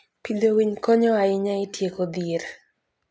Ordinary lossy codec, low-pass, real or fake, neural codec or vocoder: none; none; real; none